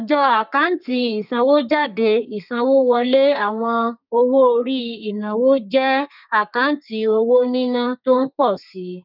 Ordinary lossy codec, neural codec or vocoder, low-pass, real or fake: none; codec, 32 kHz, 1.9 kbps, SNAC; 5.4 kHz; fake